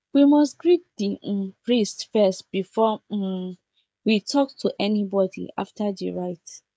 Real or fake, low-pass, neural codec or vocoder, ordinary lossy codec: fake; none; codec, 16 kHz, 16 kbps, FreqCodec, smaller model; none